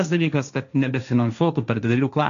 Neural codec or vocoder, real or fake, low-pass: codec, 16 kHz, 1.1 kbps, Voila-Tokenizer; fake; 7.2 kHz